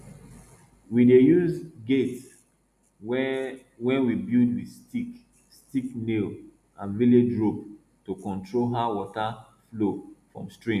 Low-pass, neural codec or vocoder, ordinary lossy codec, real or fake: 14.4 kHz; none; none; real